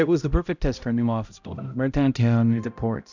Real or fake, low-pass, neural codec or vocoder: fake; 7.2 kHz; codec, 16 kHz, 0.5 kbps, X-Codec, HuBERT features, trained on balanced general audio